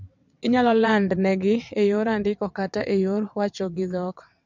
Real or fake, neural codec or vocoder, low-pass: fake; vocoder, 22.05 kHz, 80 mel bands, WaveNeXt; 7.2 kHz